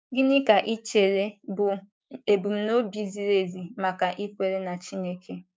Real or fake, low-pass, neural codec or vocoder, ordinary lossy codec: fake; none; codec, 16 kHz, 6 kbps, DAC; none